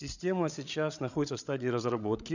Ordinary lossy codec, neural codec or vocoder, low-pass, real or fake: none; codec, 16 kHz, 16 kbps, FreqCodec, larger model; 7.2 kHz; fake